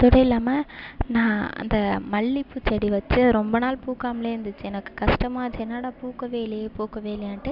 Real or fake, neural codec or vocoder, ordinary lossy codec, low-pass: real; none; none; 5.4 kHz